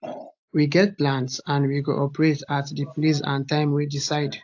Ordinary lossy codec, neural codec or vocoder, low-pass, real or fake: AAC, 48 kbps; none; 7.2 kHz; real